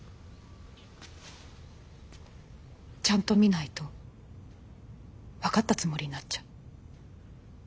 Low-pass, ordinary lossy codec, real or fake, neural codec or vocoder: none; none; real; none